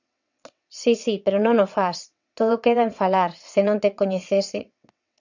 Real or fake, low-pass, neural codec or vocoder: fake; 7.2 kHz; vocoder, 22.05 kHz, 80 mel bands, WaveNeXt